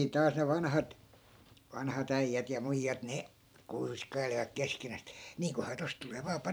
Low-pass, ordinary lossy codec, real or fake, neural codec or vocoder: none; none; fake; vocoder, 44.1 kHz, 128 mel bands every 512 samples, BigVGAN v2